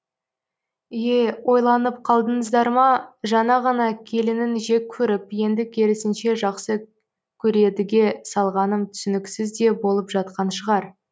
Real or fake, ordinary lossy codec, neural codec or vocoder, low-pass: real; none; none; none